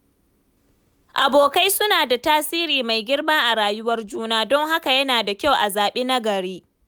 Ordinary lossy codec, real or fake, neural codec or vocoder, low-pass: none; real; none; none